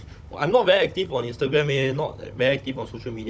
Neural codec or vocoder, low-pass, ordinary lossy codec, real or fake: codec, 16 kHz, 16 kbps, FunCodec, trained on Chinese and English, 50 frames a second; none; none; fake